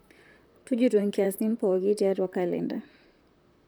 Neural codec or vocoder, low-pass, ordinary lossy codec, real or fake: vocoder, 44.1 kHz, 128 mel bands, Pupu-Vocoder; none; none; fake